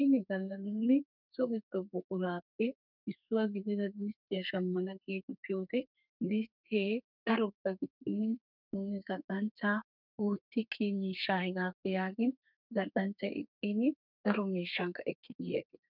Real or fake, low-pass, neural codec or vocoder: fake; 5.4 kHz; codec, 32 kHz, 1.9 kbps, SNAC